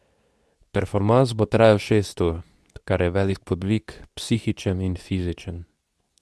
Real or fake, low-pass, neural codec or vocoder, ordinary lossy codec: fake; none; codec, 24 kHz, 0.9 kbps, WavTokenizer, medium speech release version 2; none